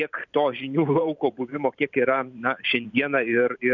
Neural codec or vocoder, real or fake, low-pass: vocoder, 44.1 kHz, 128 mel bands every 512 samples, BigVGAN v2; fake; 7.2 kHz